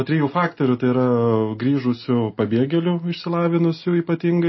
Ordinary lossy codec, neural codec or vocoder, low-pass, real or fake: MP3, 24 kbps; none; 7.2 kHz; real